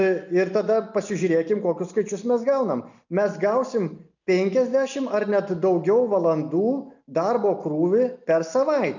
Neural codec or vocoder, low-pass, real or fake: none; 7.2 kHz; real